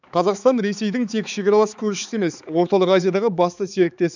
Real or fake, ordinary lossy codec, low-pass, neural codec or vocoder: fake; none; 7.2 kHz; codec, 16 kHz, 4 kbps, X-Codec, HuBERT features, trained on balanced general audio